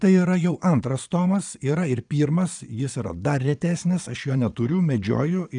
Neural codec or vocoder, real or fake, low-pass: vocoder, 22.05 kHz, 80 mel bands, Vocos; fake; 9.9 kHz